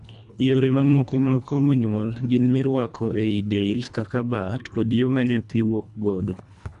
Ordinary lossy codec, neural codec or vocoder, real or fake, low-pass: MP3, 96 kbps; codec, 24 kHz, 1.5 kbps, HILCodec; fake; 10.8 kHz